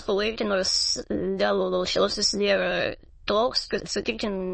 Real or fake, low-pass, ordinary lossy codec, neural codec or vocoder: fake; 9.9 kHz; MP3, 32 kbps; autoencoder, 22.05 kHz, a latent of 192 numbers a frame, VITS, trained on many speakers